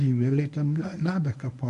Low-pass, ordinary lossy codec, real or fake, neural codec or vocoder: 10.8 kHz; AAC, 96 kbps; fake; codec, 24 kHz, 0.9 kbps, WavTokenizer, medium speech release version 1